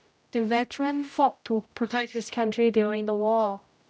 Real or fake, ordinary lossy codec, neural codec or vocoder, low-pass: fake; none; codec, 16 kHz, 0.5 kbps, X-Codec, HuBERT features, trained on general audio; none